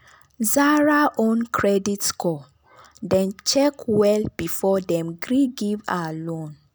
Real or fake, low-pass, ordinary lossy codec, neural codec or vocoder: real; none; none; none